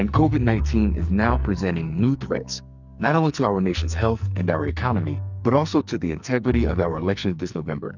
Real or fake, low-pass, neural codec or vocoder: fake; 7.2 kHz; codec, 44.1 kHz, 2.6 kbps, SNAC